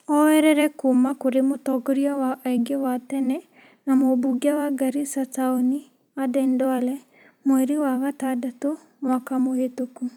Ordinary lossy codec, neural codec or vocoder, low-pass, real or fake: none; vocoder, 44.1 kHz, 128 mel bands every 256 samples, BigVGAN v2; 19.8 kHz; fake